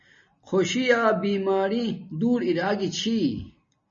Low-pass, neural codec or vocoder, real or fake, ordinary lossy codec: 7.2 kHz; none; real; MP3, 32 kbps